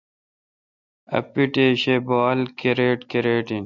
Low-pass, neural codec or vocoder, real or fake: 7.2 kHz; none; real